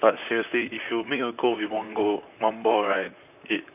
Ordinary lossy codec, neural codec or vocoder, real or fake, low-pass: none; vocoder, 44.1 kHz, 128 mel bands, Pupu-Vocoder; fake; 3.6 kHz